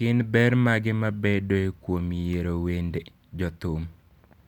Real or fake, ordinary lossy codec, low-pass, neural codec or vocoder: real; none; 19.8 kHz; none